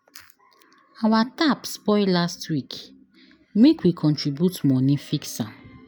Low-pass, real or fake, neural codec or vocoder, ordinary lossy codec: none; real; none; none